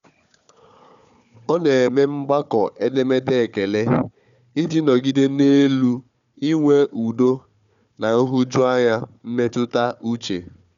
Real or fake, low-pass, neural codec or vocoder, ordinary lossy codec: fake; 7.2 kHz; codec, 16 kHz, 4 kbps, FunCodec, trained on Chinese and English, 50 frames a second; none